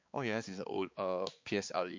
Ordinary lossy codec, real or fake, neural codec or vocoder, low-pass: MP3, 48 kbps; fake; codec, 16 kHz, 4 kbps, X-Codec, HuBERT features, trained on balanced general audio; 7.2 kHz